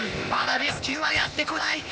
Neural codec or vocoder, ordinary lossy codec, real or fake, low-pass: codec, 16 kHz, 0.8 kbps, ZipCodec; none; fake; none